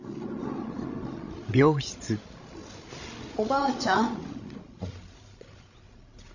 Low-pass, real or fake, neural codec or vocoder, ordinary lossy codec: 7.2 kHz; fake; codec, 16 kHz, 16 kbps, FreqCodec, larger model; none